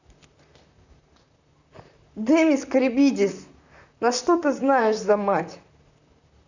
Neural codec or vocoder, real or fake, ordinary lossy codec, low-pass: vocoder, 44.1 kHz, 128 mel bands, Pupu-Vocoder; fake; none; 7.2 kHz